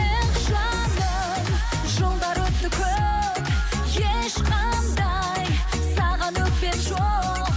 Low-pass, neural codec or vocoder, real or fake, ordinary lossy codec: none; none; real; none